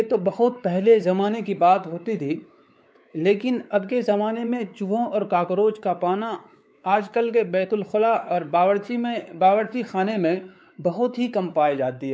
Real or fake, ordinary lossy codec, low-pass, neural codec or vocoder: fake; none; none; codec, 16 kHz, 4 kbps, X-Codec, WavLM features, trained on Multilingual LibriSpeech